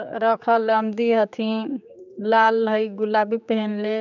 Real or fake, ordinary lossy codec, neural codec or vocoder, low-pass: fake; none; codec, 16 kHz, 4 kbps, X-Codec, HuBERT features, trained on general audio; 7.2 kHz